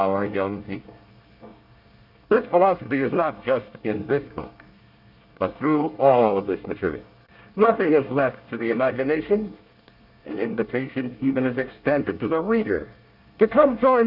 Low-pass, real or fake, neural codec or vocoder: 5.4 kHz; fake; codec, 24 kHz, 1 kbps, SNAC